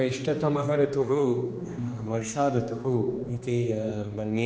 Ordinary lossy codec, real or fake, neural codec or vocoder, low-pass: none; fake; codec, 16 kHz, 2 kbps, X-Codec, HuBERT features, trained on general audio; none